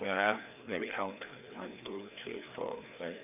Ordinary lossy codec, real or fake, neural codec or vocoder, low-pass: none; fake; codec, 16 kHz, 2 kbps, FreqCodec, larger model; 3.6 kHz